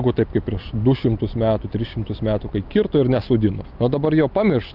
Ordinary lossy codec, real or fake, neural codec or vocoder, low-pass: Opus, 24 kbps; real; none; 5.4 kHz